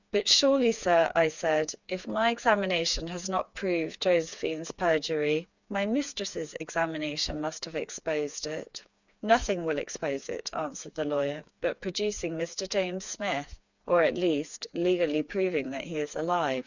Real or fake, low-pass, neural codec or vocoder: fake; 7.2 kHz; codec, 16 kHz, 4 kbps, FreqCodec, smaller model